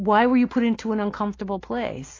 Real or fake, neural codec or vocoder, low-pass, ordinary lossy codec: real; none; 7.2 kHz; AAC, 32 kbps